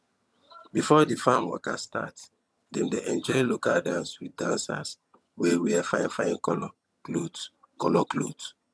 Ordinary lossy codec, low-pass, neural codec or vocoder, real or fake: none; none; vocoder, 22.05 kHz, 80 mel bands, HiFi-GAN; fake